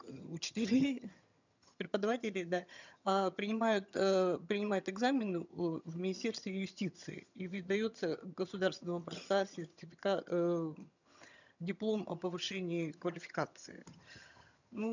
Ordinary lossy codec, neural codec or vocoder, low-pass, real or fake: none; vocoder, 22.05 kHz, 80 mel bands, HiFi-GAN; 7.2 kHz; fake